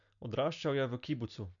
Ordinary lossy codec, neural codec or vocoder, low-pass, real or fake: none; none; 7.2 kHz; real